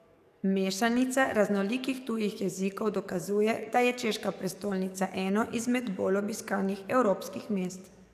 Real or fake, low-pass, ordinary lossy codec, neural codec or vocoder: fake; 14.4 kHz; none; codec, 44.1 kHz, 7.8 kbps, DAC